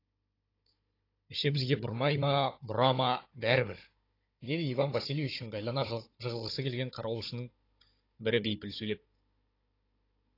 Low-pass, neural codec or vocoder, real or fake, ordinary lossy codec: 5.4 kHz; codec, 16 kHz, 16 kbps, FunCodec, trained on Chinese and English, 50 frames a second; fake; AAC, 32 kbps